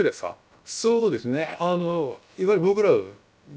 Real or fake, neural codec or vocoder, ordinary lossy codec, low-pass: fake; codec, 16 kHz, about 1 kbps, DyCAST, with the encoder's durations; none; none